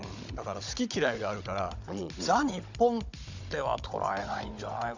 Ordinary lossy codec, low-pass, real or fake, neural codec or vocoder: Opus, 64 kbps; 7.2 kHz; fake; codec, 16 kHz, 16 kbps, FunCodec, trained on Chinese and English, 50 frames a second